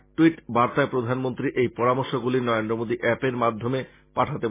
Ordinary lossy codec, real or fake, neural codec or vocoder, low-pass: MP3, 16 kbps; real; none; 3.6 kHz